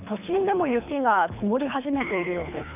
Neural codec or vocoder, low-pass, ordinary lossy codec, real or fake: codec, 24 kHz, 3 kbps, HILCodec; 3.6 kHz; none; fake